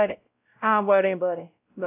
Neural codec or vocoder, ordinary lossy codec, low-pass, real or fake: codec, 16 kHz, 0.5 kbps, X-Codec, WavLM features, trained on Multilingual LibriSpeech; AAC, 24 kbps; 3.6 kHz; fake